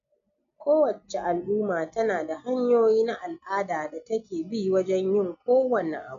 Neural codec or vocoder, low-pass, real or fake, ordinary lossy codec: none; 7.2 kHz; real; none